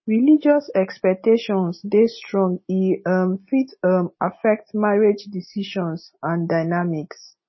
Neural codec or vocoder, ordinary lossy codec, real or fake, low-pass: none; MP3, 24 kbps; real; 7.2 kHz